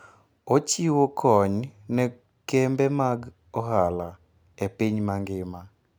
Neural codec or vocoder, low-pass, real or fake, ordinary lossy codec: none; none; real; none